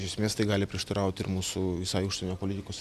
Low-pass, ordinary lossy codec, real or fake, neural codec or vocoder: 14.4 kHz; Opus, 64 kbps; real; none